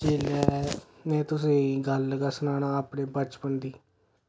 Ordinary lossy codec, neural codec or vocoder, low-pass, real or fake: none; none; none; real